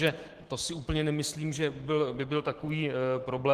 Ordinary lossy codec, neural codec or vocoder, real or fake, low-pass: Opus, 16 kbps; autoencoder, 48 kHz, 128 numbers a frame, DAC-VAE, trained on Japanese speech; fake; 14.4 kHz